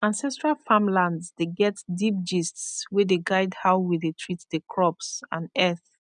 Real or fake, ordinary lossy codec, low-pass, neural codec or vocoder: real; none; 9.9 kHz; none